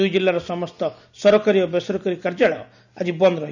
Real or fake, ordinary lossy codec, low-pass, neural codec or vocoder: real; none; 7.2 kHz; none